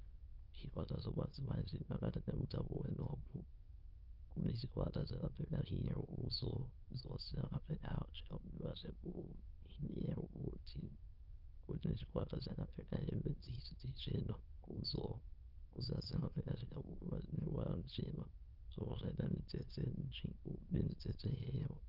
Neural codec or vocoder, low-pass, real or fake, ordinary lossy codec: autoencoder, 22.05 kHz, a latent of 192 numbers a frame, VITS, trained on many speakers; 5.4 kHz; fake; Opus, 24 kbps